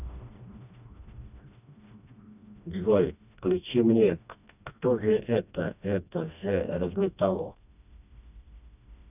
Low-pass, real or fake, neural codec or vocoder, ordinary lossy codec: 3.6 kHz; fake; codec, 16 kHz, 1 kbps, FreqCodec, smaller model; none